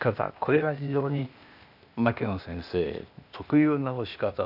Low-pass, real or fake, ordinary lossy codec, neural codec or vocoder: 5.4 kHz; fake; none; codec, 16 kHz, 0.8 kbps, ZipCodec